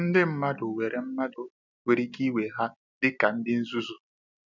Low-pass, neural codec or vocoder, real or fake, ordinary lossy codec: 7.2 kHz; none; real; none